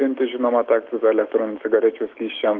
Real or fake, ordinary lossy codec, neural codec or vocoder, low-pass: fake; Opus, 32 kbps; autoencoder, 48 kHz, 128 numbers a frame, DAC-VAE, trained on Japanese speech; 7.2 kHz